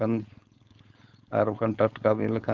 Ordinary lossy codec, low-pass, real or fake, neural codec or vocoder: Opus, 32 kbps; 7.2 kHz; fake; codec, 16 kHz, 4.8 kbps, FACodec